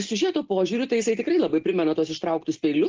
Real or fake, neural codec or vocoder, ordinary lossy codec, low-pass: real; none; Opus, 16 kbps; 7.2 kHz